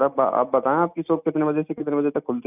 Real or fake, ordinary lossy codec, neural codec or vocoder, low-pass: real; none; none; 3.6 kHz